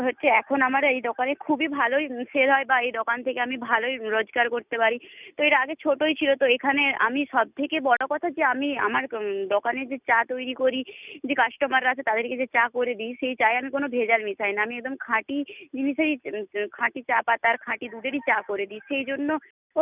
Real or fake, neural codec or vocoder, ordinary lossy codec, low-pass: real; none; none; 3.6 kHz